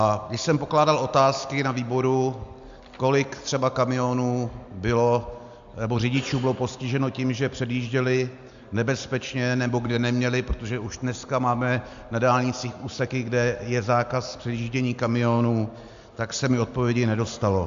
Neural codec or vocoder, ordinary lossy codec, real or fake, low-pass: none; MP3, 64 kbps; real; 7.2 kHz